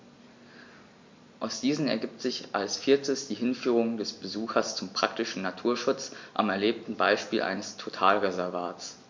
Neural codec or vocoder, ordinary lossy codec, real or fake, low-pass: none; MP3, 48 kbps; real; 7.2 kHz